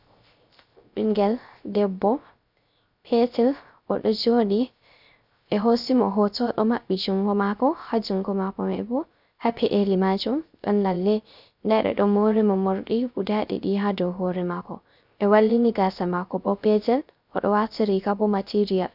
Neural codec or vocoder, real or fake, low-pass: codec, 16 kHz, 0.3 kbps, FocalCodec; fake; 5.4 kHz